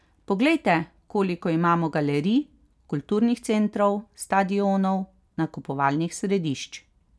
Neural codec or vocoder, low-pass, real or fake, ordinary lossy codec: none; none; real; none